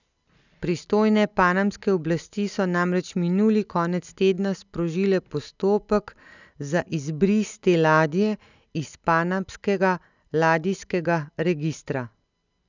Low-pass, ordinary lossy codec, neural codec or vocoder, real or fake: 7.2 kHz; none; none; real